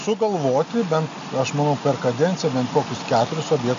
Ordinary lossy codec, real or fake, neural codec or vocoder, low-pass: AAC, 64 kbps; real; none; 7.2 kHz